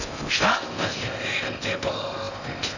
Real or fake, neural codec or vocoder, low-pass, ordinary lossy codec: fake; codec, 16 kHz in and 24 kHz out, 0.6 kbps, FocalCodec, streaming, 4096 codes; 7.2 kHz; none